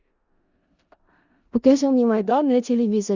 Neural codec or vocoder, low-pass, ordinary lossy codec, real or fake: codec, 16 kHz in and 24 kHz out, 0.4 kbps, LongCat-Audio-Codec, four codebook decoder; 7.2 kHz; Opus, 64 kbps; fake